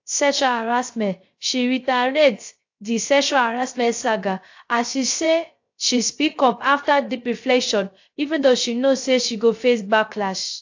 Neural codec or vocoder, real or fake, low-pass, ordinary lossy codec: codec, 16 kHz, 0.3 kbps, FocalCodec; fake; 7.2 kHz; AAC, 48 kbps